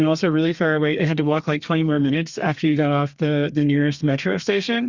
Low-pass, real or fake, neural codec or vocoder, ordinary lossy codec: 7.2 kHz; fake; codec, 32 kHz, 1.9 kbps, SNAC; Opus, 64 kbps